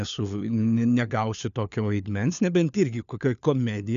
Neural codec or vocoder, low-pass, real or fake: codec, 16 kHz, 4 kbps, FunCodec, trained on LibriTTS, 50 frames a second; 7.2 kHz; fake